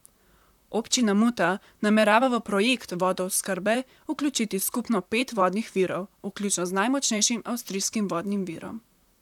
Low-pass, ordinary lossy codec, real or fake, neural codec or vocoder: 19.8 kHz; none; fake; vocoder, 44.1 kHz, 128 mel bands, Pupu-Vocoder